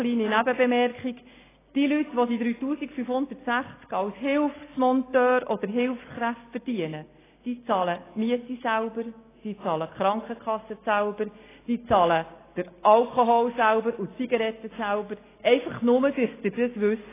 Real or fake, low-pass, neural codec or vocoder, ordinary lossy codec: real; 3.6 kHz; none; AAC, 16 kbps